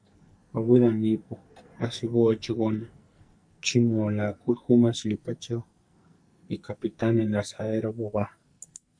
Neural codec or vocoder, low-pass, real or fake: codec, 44.1 kHz, 2.6 kbps, SNAC; 9.9 kHz; fake